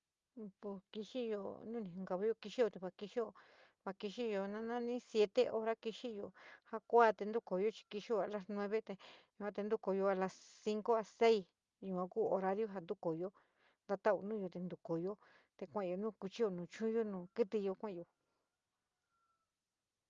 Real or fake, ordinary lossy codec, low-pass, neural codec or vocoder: real; Opus, 32 kbps; 7.2 kHz; none